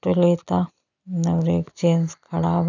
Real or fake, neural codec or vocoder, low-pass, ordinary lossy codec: real; none; 7.2 kHz; none